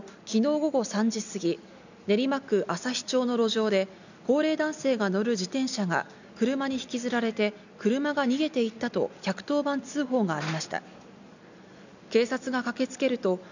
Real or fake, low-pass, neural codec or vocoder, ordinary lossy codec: real; 7.2 kHz; none; none